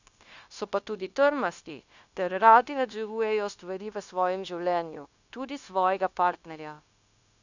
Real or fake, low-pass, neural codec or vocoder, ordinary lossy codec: fake; 7.2 kHz; codec, 16 kHz, 0.9 kbps, LongCat-Audio-Codec; none